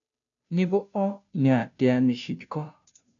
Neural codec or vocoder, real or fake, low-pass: codec, 16 kHz, 0.5 kbps, FunCodec, trained on Chinese and English, 25 frames a second; fake; 7.2 kHz